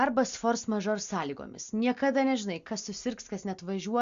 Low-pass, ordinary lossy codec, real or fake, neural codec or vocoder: 7.2 kHz; Opus, 64 kbps; real; none